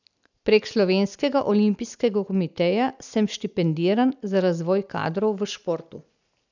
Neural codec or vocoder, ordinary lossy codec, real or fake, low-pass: none; none; real; 7.2 kHz